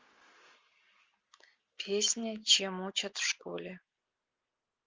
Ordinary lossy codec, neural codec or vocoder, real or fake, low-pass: Opus, 32 kbps; none; real; 7.2 kHz